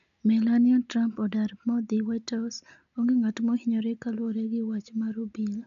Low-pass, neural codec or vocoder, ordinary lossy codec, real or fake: 7.2 kHz; none; none; real